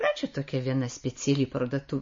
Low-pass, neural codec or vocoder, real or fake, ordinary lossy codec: 7.2 kHz; none; real; MP3, 32 kbps